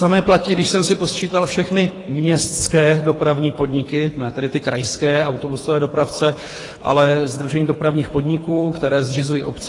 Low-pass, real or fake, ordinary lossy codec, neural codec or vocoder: 10.8 kHz; fake; AAC, 32 kbps; codec, 24 kHz, 3 kbps, HILCodec